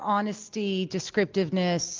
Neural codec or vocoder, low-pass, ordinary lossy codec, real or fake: none; 7.2 kHz; Opus, 32 kbps; real